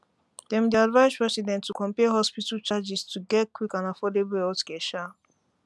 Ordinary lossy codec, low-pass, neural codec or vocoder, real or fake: none; none; none; real